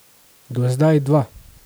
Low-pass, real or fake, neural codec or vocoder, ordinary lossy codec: none; real; none; none